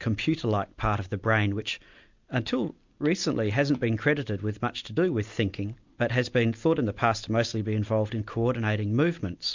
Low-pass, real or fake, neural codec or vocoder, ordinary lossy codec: 7.2 kHz; real; none; MP3, 64 kbps